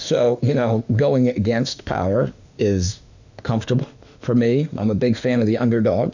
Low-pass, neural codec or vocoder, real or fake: 7.2 kHz; autoencoder, 48 kHz, 32 numbers a frame, DAC-VAE, trained on Japanese speech; fake